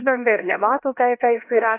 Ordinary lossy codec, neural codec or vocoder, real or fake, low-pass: AAC, 24 kbps; codec, 16 kHz, 1 kbps, X-Codec, HuBERT features, trained on LibriSpeech; fake; 3.6 kHz